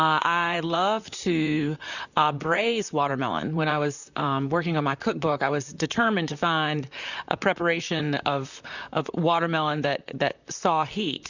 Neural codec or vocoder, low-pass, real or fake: vocoder, 44.1 kHz, 128 mel bands, Pupu-Vocoder; 7.2 kHz; fake